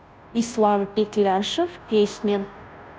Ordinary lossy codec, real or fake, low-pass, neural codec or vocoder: none; fake; none; codec, 16 kHz, 0.5 kbps, FunCodec, trained on Chinese and English, 25 frames a second